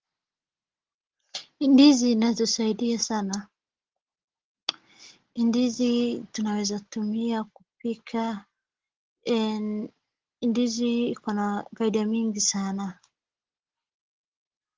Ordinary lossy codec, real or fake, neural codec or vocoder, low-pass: Opus, 16 kbps; real; none; 7.2 kHz